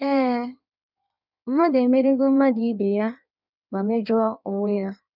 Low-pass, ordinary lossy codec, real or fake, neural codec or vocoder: 5.4 kHz; none; fake; codec, 16 kHz in and 24 kHz out, 1.1 kbps, FireRedTTS-2 codec